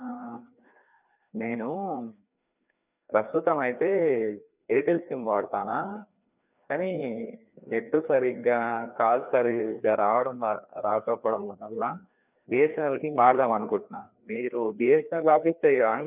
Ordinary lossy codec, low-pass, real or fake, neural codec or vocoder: none; 3.6 kHz; fake; codec, 16 kHz, 2 kbps, FreqCodec, larger model